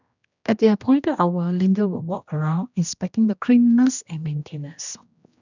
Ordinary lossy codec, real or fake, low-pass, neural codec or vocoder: none; fake; 7.2 kHz; codec, 16 kHz, 1 kbps, X-Codec, HuBERT features, trained on general audio